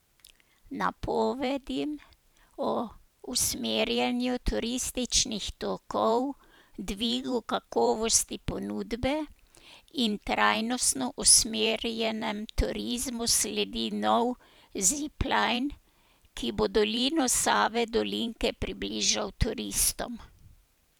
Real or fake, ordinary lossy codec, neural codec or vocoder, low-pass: fake; none; vocoder, 44.1 kHz, 128 mel bands every 512 samples, BigVGAN v2; none